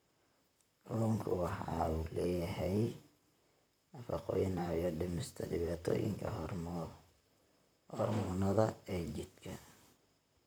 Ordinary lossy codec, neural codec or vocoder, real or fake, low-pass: none; vocoder, 44.1 kHz, 128 mel bands, Pupu-Vocoder; fake; none